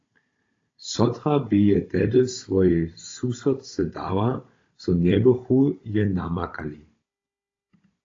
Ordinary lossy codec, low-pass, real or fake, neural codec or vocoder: AAC, 32 kbps; 7.2 kHz; fake; codec, 16 kHz, 16 kbps, FunCodec, trained on Chinese and English, 50 frames a second